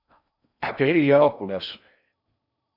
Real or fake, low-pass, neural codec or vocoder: fake; 5.4 kHz; codec, 16 kHz in and 24 kHz out, 0.6 kbps, FocalCodec, streaming, 4096 codes